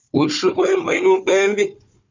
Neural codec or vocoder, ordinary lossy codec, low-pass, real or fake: codec, 16 kHz, 4 kbps, FunCodec, trained on Chinese and English, 50 frames a second; MP3, 64 kbps; 7.2 kHz; fake